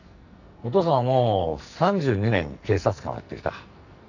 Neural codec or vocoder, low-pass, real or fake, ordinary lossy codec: codec, 44.1 kHz, 2.6 kbps, SNAC; 7.2 kHz; fake; none